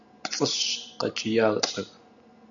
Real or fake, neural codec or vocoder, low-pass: real; none; 7.2 kHz